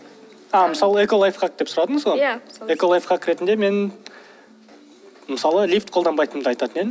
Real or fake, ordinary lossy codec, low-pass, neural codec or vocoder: real; none; none; none